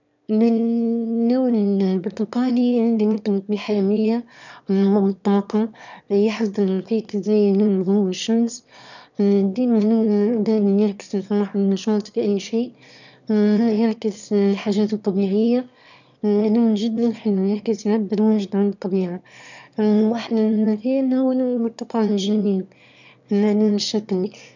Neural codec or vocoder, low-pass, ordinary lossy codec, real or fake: autoencoder, 22.05 kHz, a latent of 192 numbers a frame, VITS, trained on one speaker; 7.2 kHz; none; fake